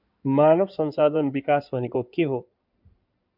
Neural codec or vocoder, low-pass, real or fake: codec, 16 kHz in and 24 kHz out, 2.2 kbps, FireRedTTS-2 codec; 5.4 kHz; fake